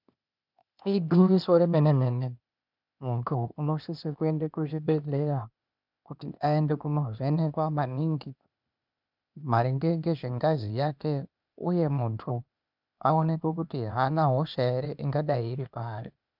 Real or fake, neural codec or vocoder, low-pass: fake; codec, 16 kHz, 0.8 kbps, ZipCodec; 5.4 kHz